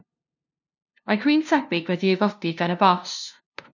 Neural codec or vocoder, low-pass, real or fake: codec, 16 kHz, 0.5 kbps, FunCodec, trained on LibriTTS, 25 frames a second; 7.2 kHz; fake